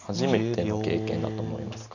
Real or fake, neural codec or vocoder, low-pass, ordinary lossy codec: real; none; 7.2 kHz; none